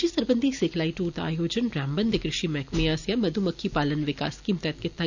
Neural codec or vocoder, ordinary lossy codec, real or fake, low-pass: none; none; real; 7.2 kHz